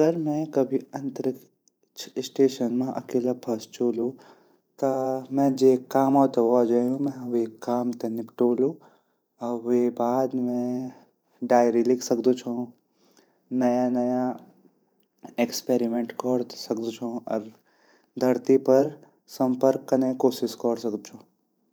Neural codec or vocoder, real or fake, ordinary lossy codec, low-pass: vocoder, 44.1 kHz, 128 mel bands every 512 samples, BigVGAN v2; fake; none; none